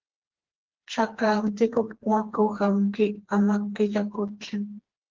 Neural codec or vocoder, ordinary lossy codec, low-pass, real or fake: codec, 16 kHz, 2 kbps, FreqCodec, smaller model; Opus, 16 kbps; 7.2 kHz; fake